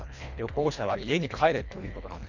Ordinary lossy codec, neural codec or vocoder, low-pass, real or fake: none; codec, 24 kHz, 1.5 kbps, HILCodec; 7.2 kHz; fake